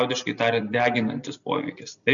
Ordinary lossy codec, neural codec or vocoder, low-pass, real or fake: MP3, 96 kbps; none; 7.2 kHz; real